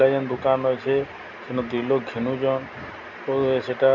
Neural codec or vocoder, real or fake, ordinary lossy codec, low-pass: none; real; none; 7.2 kHz